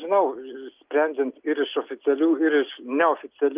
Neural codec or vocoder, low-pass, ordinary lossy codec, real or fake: none; 3.6 kHz; Opus, 32 kbps; real